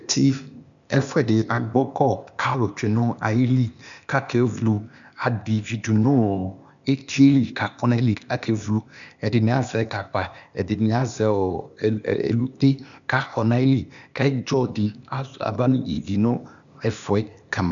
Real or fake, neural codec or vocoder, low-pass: fake; codec, 16 kHz, 0.8 kbps, ZipCodec; 7.2 kHz